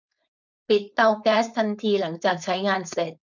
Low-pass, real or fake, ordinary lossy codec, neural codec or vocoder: 7.2 kHz; fake; none; codec, 16 kHz, 4.8 kbps, FACodec